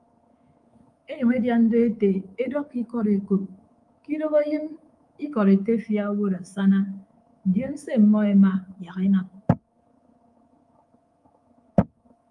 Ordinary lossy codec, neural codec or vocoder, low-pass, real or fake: Opus, 32 kbps; codec, 24 kHz, 3.1 kbps, DualCodec; 10.8 kHz; fake